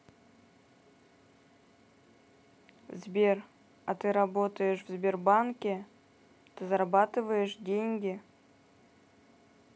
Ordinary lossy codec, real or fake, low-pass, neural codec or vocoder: none; real; none; none